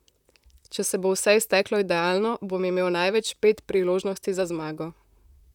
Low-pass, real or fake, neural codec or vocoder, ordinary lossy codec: 19.8 kHz; fake; vocoder, 44.1 kHz, 128 mel bands, Pupu-Vocoder; none